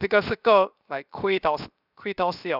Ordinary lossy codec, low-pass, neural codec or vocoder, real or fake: none; 5.4 kHz; codec, 16 kHz, 0.7 kbps, FocalCodec; fake